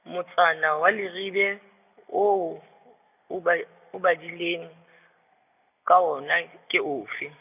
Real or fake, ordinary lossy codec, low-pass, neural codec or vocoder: real; none; 3.6 kHz; none